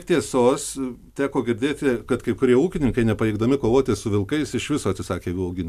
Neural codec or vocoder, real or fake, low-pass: none; real; 14.4 kHz